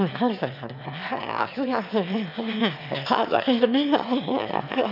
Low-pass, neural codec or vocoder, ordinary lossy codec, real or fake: 5.4 kHz; autoencoder, 22.05 kHz, a latent of 192 numbers a frame, VITS, trained on one speaker; none; fake